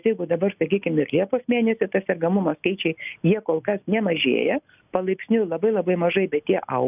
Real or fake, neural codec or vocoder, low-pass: real; none; 3.6 kHz